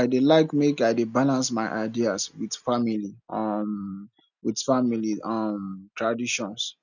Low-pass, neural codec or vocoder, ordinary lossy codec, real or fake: 7.2 kHz; none; none; real